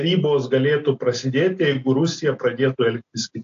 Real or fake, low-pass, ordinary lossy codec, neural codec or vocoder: real; 7.2 kHz; AAC, 48 kbps; none